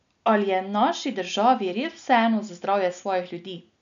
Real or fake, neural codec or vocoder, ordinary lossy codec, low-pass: real; none; none; 7.2 kHz